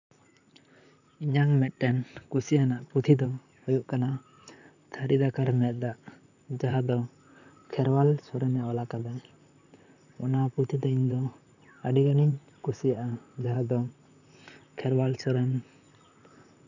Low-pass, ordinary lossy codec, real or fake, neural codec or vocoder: 7.2 kHz; none; fake; vocoder, 44.1 kHz, 128 mel bands, Pupu-Vocoder